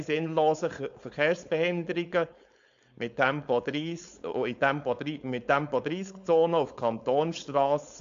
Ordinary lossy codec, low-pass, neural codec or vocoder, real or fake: AAC, 64 kbps; 7.2 kHz; codec, 16 kHz, 4.8 kbps, FACodec; fake